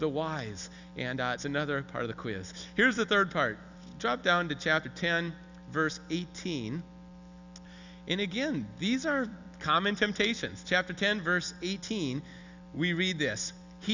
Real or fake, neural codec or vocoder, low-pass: real; none; 7.2 kHz